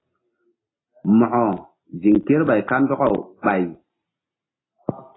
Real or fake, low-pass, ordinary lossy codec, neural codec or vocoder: real; 7.2 kHz; AAC, 16 kbps; none